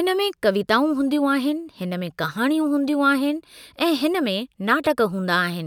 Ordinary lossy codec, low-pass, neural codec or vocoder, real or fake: none; 19.8 kHz; none; real